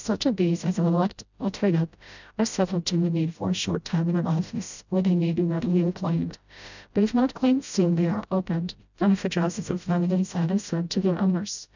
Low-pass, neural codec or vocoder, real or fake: 7.2 kHz; codec, 16 kHz, 0.5 kbps, FreqCodec, smaller model; fake